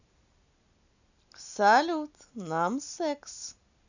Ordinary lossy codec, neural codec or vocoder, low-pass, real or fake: none; none; 7.2 kHz; real